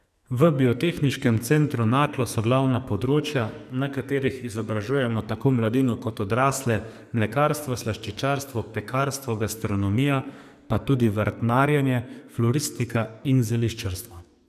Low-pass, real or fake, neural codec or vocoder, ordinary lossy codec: 14.4 kHz; fake; codec, 44.1 kHz, 2.6 kbps, SNAC; none